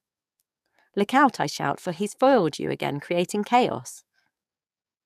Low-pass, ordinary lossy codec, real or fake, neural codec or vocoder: 14.4 kHz; none; fake; codec, 44.1 kHz, 7.8 kbps, DAC